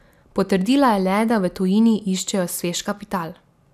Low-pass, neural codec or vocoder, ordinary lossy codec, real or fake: 14.4 kHz; none; none; real